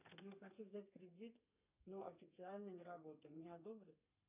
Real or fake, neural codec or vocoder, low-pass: fake; codec, 32 kHz, 1.9 kbps, SNAC; 3.6 kHz